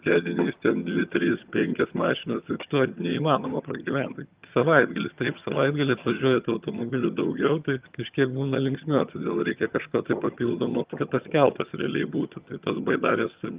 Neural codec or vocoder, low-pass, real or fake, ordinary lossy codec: vocoder, 22.05 kHz, 80 mel bands, HiFi-GAN; 3.6 kHz; fake; Opus, 32 kbps